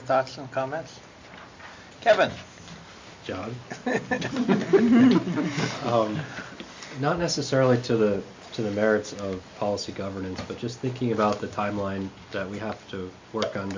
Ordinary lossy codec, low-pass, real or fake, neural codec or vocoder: MP3, 48 kbps; 7.2 kHz; real; none